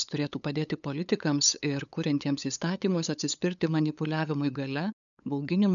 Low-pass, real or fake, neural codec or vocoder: 7.2 kHz; fake; codec, 16 kHz, 8 kbps, FunCodec, trained on LibriTTS, 25 frames a second